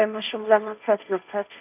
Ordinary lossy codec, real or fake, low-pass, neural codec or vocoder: none; fake; 3.6 kHz; codec, 16 kHz, 1.1 kbps, Voila-Tokenizer